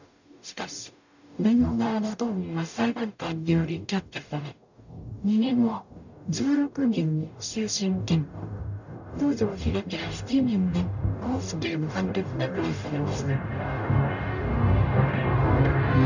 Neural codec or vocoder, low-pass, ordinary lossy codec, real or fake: codec, 44.1 kHz, 0.9 kbps, DAC; 7.2 kHz; none; fake